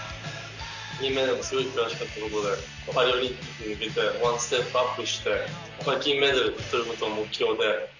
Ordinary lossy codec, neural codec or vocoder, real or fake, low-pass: none; none; real; 7.2 kHz